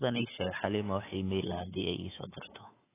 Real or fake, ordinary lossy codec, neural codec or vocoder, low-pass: fake; AAC, 16 kbps; codec, 24 kHz, 3.1 kbps, DualCodec; 3.6 kHz